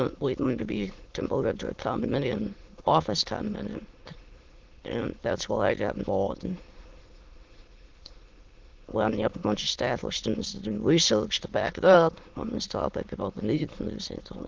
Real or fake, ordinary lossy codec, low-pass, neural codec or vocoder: fake; Opus, 16 kbps; 7.2 kHz; autoencoder, 22.05 kHz, a latent of 192 numbers a frame, VITS, trained on many speakers